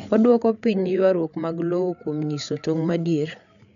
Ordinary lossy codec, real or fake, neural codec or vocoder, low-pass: none; fake; codec, 16 kHz, 8 kbps, FreqCodec, larger model; 7.2 kHz